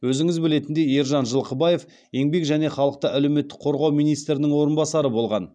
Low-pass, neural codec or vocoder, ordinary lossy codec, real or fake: 9.9 kHz; none; none; real